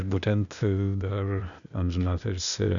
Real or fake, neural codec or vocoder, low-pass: fake; codec, 16 kHz, 0.8 kbps, ZipCodec; 7.2 kHz